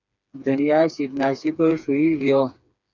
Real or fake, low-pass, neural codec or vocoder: fake; 7.2 kHz; codec, 16 kHz, 4 kbps, FreqCodec, smaller model